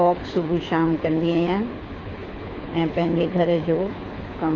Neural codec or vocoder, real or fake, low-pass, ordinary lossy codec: codec, 24 kHz, 6 kbps, HILCodec; fake; 7.2 kHz; AAC, 32 kbps